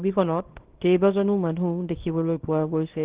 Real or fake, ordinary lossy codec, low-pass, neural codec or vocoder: fake; Opus, 16 kbps; 3.6 kHz; codec, 24 kHz, 0.9 kbps, WavTokenizer, small release